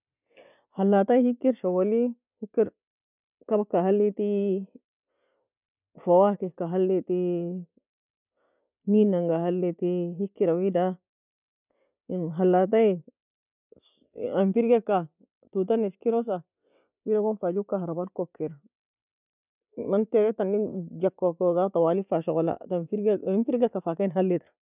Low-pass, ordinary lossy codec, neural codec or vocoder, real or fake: 3.6 kHz; none; none; real